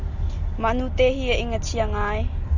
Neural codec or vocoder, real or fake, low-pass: none; real; 7.2 kHz